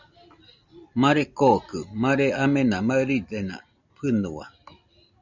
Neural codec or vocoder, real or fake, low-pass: none; real; 7.2 kHz